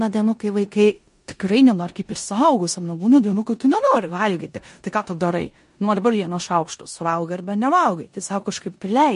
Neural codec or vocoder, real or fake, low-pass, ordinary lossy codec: codec, 16 kHz in and 24 kHz out, 0.9 kbps, LongCat-Audio-Codec, four codebook decoder; fake; 10.8 kHz; MP3, 48 kbps